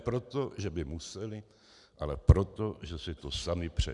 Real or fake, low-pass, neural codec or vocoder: real; 10.8 kHz; none